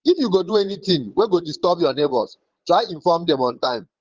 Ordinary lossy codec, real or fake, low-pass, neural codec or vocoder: Opus, 16 kbps; fake; 7.2 kHz; vocoder, 22.05 kHz, 80 mel bands, Vocos